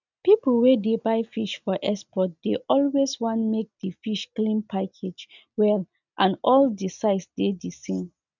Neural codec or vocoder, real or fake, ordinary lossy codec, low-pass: none; real; none; 7.2 kHz